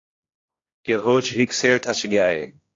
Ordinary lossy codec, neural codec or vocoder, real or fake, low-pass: AAC, 32 kbps; codec, 16 kHz, 1 kbps, X-Codec, HuBERT features, trained on general audio; fake; 7.2 kHz